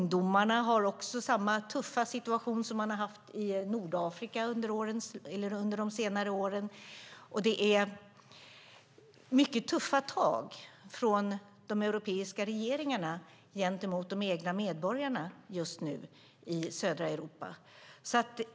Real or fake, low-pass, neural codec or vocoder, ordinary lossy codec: real; none; none; none